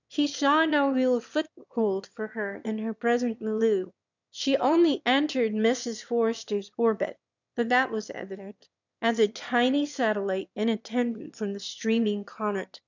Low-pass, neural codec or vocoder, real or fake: 7.2 kHz; autoencoder, 22.05 kHz, a latent of 192 numbers a frame, VITS, trained on one speaker; fake